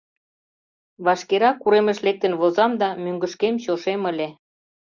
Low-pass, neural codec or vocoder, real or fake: 7.2 kHz; none; real